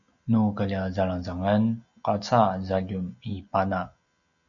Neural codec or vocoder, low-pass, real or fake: none; 7.2 kHz; real